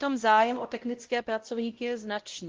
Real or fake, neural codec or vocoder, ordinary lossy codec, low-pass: fake; codec, 16 kHz, 0.5 kbps, X-Codec, WavLM features, trained on Multilingual LibriSpeech; Opus, 16 kbps; 7.2 kHz